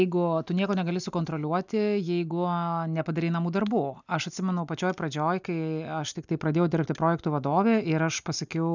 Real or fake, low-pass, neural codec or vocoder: real; 7.2 kHz; none